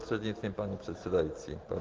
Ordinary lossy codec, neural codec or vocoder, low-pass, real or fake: Opus, 16 kbps; none; 7.2 kHz; real